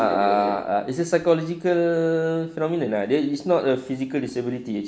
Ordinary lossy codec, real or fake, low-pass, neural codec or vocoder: none; real; none; none